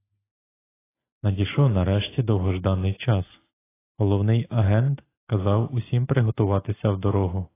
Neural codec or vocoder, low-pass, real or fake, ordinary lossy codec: none; 3.6 kHz; real; AAC, 16 kbps